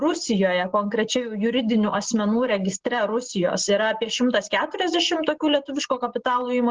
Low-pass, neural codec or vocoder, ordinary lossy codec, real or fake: 7.2 kHz; none; Opus, 16 kbps; real